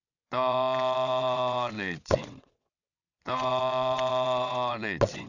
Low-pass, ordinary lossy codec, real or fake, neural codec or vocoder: 7.2 kHz; none; fake; vocoder, 22.05 kHz, 80 mel bands, WaveNeXt